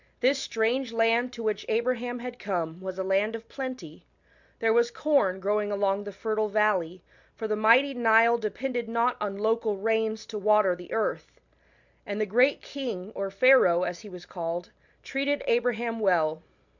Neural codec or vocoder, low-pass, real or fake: none; 7.2 kHz; real